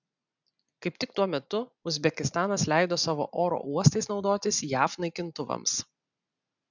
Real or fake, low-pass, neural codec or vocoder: real; 7.2 kHz; none